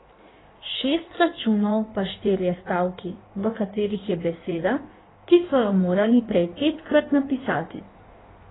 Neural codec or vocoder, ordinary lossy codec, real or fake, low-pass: codec, 16 kHz in and 24 kHz out, 1.1 kbps, FireRedTTS-2 codec; AAC, 16 kbps; fake; 7.2 kHz